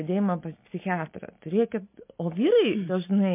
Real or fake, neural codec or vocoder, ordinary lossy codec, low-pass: real; none; MP3, 24 kbps; 3.6 kHz